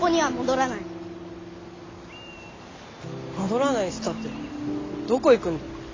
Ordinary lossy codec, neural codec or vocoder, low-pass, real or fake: AAC, 32 kbps; none; 7.2 kHz; real